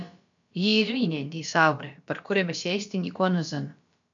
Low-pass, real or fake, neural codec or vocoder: 7.2 kHz; fake; codec, 16 kHz, about 1 kbps, DyCAST, with the encoder's durations